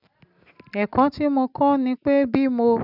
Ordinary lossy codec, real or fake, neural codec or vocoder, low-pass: none; real; none; 5.4 kHz